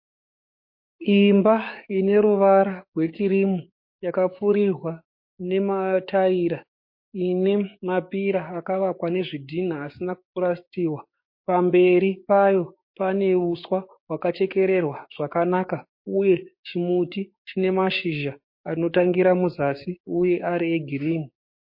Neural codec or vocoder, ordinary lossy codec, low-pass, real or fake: codec, 16 kHz, 6 kbps, DAC; MP3, 32 kbps; 5.4 kHz; fake